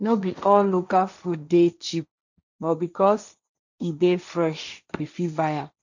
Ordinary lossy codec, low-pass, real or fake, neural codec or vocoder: none; 7.2 kHz; fake; codec, 16 kHz, 1.1 kbps, Voila-Tokenizer